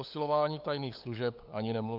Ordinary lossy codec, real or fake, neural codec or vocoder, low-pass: AAC, 48 kbps; fake; codec, 16 kHz, 8 kbps, FunCodec, trained on LibriTTS, 25 frames a second; 5.4 kHz